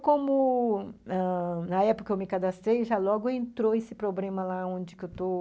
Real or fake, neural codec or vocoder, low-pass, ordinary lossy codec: real; none; none; none